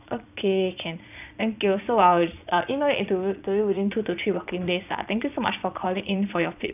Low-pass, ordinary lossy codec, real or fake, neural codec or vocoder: 3.6 kHz; none; fake; vocoder, 22.05 kHz, 80 mel bands, Vocos